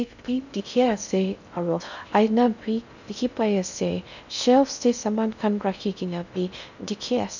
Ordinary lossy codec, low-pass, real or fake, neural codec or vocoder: none; 7.2 kHz; fake; codec, 16 kHz in and 24 kHz out, 0.6 kbps, FocalCodec, streaming, 2048 codes